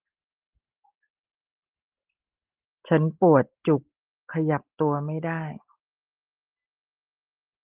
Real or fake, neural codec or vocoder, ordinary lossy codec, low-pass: real; none; Opus, 16 kbps; 3.6 kHz